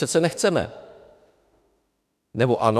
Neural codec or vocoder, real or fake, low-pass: autoencoder, 48 kHz, 32 numbers a frame, DAC-VAE, trained on Japanese speech; fake; 14.4 kHz